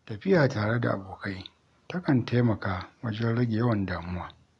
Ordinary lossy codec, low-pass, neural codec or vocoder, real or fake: none; 10.8 kHz; none; real